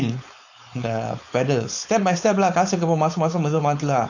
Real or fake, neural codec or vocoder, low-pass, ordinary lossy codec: fake; codec, 16 kHz, 4.8 kbps, FACodec; 7.2 kHz; AAC, 48 kbps